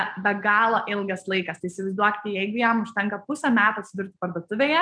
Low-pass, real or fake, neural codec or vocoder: 9.9 kHz; real; none